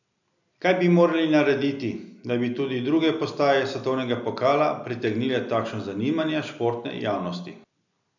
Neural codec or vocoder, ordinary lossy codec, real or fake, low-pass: none; none; real; 7.2 kHz